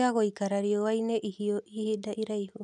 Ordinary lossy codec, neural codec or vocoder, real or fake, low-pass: none; none; real; none